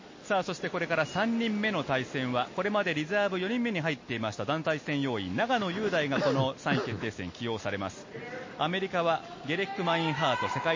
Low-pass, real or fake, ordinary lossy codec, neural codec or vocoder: 7.2 kHz; real; MP3, 32 kbps; none